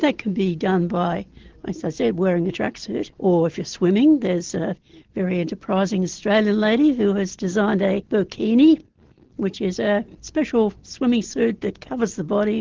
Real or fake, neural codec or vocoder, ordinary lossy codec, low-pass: real; none; Opus, 16 kbps; 7.2 kHz